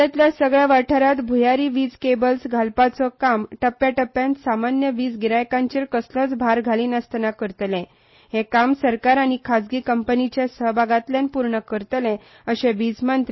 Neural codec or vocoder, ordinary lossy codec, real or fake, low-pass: none; MP3, 24 kbps; real; 7.2 kHz